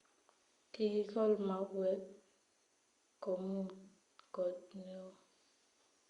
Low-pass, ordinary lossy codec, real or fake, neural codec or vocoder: 10.8 kHz; Opus, 64 kbps; fake; vocoder, 24 kHz, 100 mel bands, Vocos